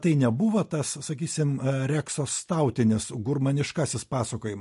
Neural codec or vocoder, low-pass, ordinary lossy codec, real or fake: none; 14.4 kHz; MP3, 48 kbps; real